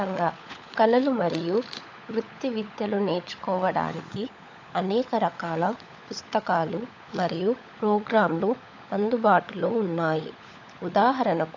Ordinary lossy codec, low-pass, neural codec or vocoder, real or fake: AAC, 48 kbps; 7.2 kHz; codec, 16 kHz, 16 kbps, FunCodec, trained on LibriTTS, 50 frames a second; fake